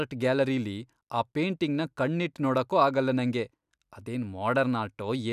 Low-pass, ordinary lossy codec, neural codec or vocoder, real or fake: 14.4 kHz; none; none; real